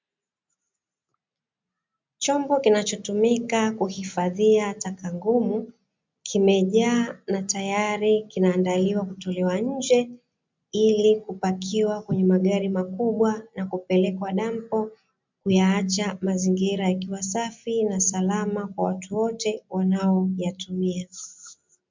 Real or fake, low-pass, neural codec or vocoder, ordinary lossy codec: real; 7.2 kHz; none; MP3, 64 kbps